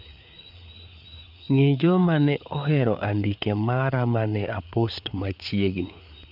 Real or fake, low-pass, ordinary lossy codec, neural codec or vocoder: fake; 5.4 kHz; none; codec, 16 kHz, 4 kbps, FreqCodec, larger model